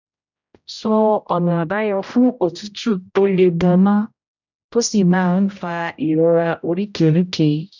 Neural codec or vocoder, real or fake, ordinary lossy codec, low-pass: codec, 16 kHz, 0.5 kbps, X-Codec, HuBERT features, trained on general audio; fake; none; 7.2 kHz